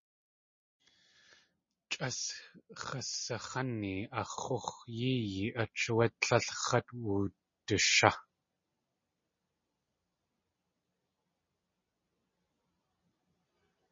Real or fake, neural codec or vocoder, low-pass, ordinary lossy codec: real; none; 7.2 kHz; MP3, 32 kbps